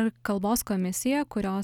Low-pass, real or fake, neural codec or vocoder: 19.8 kHz; real; none